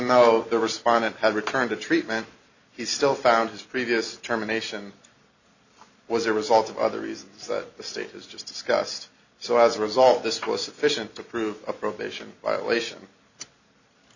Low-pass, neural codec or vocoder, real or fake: 7.2 kHz; none; real